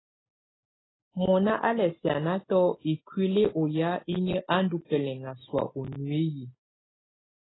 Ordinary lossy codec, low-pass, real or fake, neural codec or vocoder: AAC, 16 kbps; 7.2 kHz; real; none